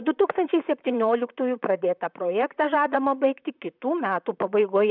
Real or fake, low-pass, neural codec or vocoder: fake; 5.4 kHz; vocoder, 44.1 kHz, 128 mel bands, Pupu-Vocoder